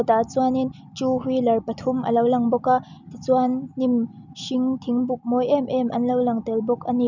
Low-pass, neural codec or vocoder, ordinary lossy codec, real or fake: 7.2 kHz; none; none; real